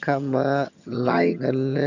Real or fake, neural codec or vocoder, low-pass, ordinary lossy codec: fake; vocoder, 22.05 kHz, 80 mel bands, HiFi-GAN; 7.2 kHz; none